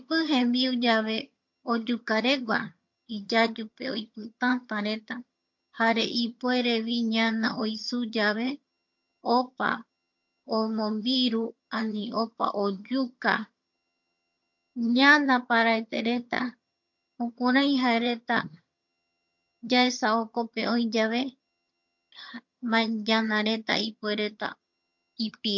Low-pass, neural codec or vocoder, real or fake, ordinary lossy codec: 7.2 kHz; vocoder, 22.05 kHz, 80 mel bands, HiFi-GAN; fake; MP3, 48 kbps